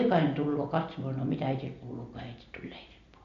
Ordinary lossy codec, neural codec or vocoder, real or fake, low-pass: MP3, 48 kbps; none; real; 7.2 kHz